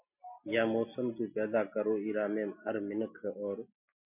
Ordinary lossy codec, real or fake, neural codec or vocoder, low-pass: MP3, 32 kbps; real; none; 3.6 kHz